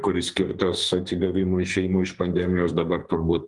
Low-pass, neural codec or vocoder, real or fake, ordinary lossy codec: 10.8 kHz; codec, 44.1 kHz, 2.6 kbps, SNAC; fake; Opus, 32 kbps